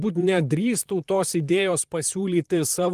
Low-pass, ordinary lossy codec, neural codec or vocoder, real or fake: 14.4 kHz; Opus, 24 kbps; vocoder, 44.1 kHz, 128 mel bands, Pupu-Vocoder; fake